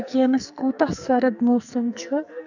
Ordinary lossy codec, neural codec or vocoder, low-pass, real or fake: none; codec, 44.1 kHz, 2.6 kbps, SNAC; 7.2 kHz; fake